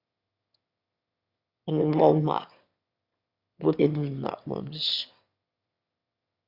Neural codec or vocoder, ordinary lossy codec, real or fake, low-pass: autoencoder, 22.05 kHz, a latent of 192 numbers a frame, VITS, trained on one speaker; Opus, 64 kbps; fake; 5.4 kHz